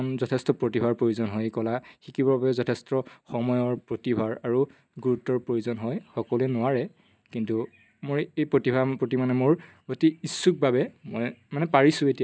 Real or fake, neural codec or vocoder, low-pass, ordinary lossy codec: real; none; none; none